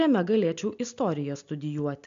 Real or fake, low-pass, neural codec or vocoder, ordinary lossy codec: real; 7.2 kHz; none; MP3, 64 kbps